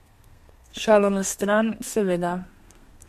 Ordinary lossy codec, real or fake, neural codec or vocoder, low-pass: MP3, 64 kbps; fake; codec, 32 kHz, 1.9 kbps, SNAC; 14.4 kHz